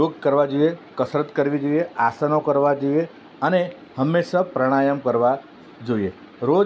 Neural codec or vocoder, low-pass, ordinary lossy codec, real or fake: none; none; none; real